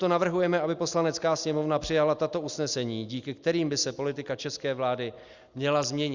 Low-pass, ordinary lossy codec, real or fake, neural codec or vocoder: 7.2 kHz; Opus, 64 kbps; real; none